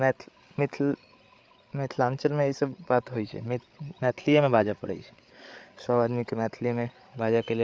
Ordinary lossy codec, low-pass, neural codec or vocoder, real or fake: none; none; codec, 16 kHz, 8 kbps, FreqCodec, larger model; fake